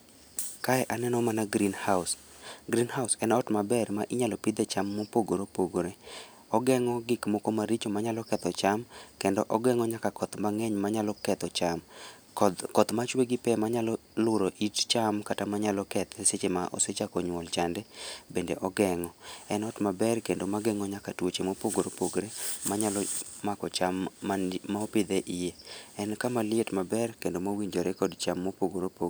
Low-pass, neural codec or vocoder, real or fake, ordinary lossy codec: none; none; real; none